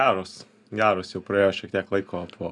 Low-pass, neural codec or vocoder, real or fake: 10.8 kHz; none; real